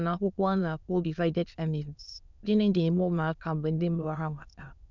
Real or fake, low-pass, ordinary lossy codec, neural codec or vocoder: fake; 7.2 kHz; none; autoencoder, 22.05 kHz, a latent of 192 numbers a frame, VITS, trained on many speakers